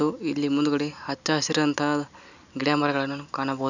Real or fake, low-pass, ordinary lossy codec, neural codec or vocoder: real; 7.2 kHz; none; none